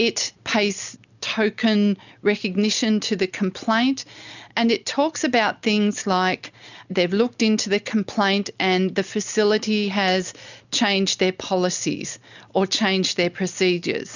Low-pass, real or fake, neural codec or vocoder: 7.2 kHz; real; none